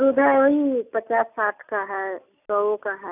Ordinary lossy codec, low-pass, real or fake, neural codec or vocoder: none; 3.6 kHz; real; none